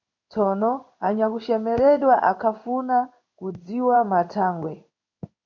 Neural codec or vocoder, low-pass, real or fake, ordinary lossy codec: codec, 16 kHz in and 24 kHz out, 1 kbps, XY-Tokenizer; 7.2 kHz; fake; MP3, 48 kbps